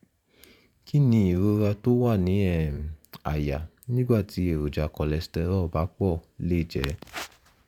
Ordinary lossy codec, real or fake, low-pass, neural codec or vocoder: none; fake; 19.8 kHz; vocoder, 44.1 kHz, 128 mel bands every 512 samples, BigVGAN v2